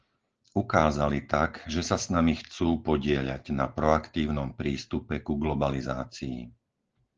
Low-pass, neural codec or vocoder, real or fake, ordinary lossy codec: 7.2 kHz; none; real; Opus, 16 kbps